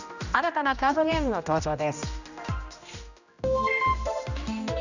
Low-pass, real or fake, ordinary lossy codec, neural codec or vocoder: 7.2 kHz; fake; none; codec, 16 kHz, 1 kbps, X-Codec, HuBERT features, trained on general audio